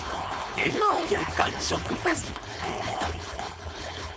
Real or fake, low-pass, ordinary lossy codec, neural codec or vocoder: fake; none; none; codec, 16 kHz, 4.8 kbps, FACodec